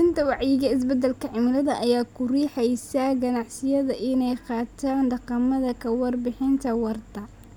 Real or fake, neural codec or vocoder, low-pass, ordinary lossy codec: real; none; 19.8 kHz; none